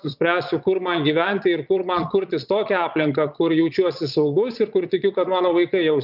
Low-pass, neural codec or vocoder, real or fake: 5.4 kHz; vocoder, 22.05 kHz, 80 mel bands, Vocos; fake